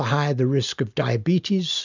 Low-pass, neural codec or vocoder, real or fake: 7.2 kHz; none; real